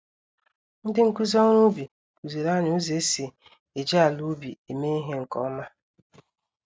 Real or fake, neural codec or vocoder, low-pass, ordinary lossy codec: real; none; none; none